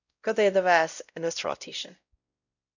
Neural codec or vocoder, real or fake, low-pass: codec, 16 kHz, 0.5 kbps, X-Codec, WavLM features, trained on Multilingual LibriSpeech; fake; 7.2 kHz